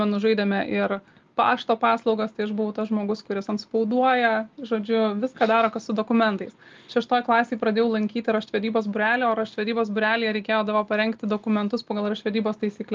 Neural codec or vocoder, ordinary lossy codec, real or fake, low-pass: none; Opus, 32 kbps; real; 7.2 kHz